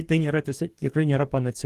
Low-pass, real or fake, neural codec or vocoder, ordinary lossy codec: 14.4 kHz; fake; codec, 44.1 kHz, 2.6 kbps, DAC; Opus, 24 kbps